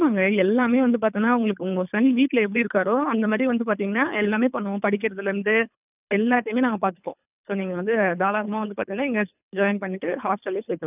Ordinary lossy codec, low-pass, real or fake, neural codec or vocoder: none; 3.6 kHz; fake; codec, 24 kHz, 3 kbps, HILCodec